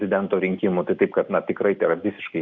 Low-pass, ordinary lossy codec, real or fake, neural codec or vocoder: 7.2 kHz; Opus, 64 kbps; real; none